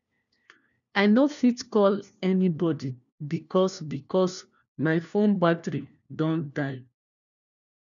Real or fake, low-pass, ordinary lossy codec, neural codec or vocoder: fake; 7.2 kHz; none; codec, 16 kHz, 1 kbps, FunCodec, trained on LibriTTS, 50 frames a second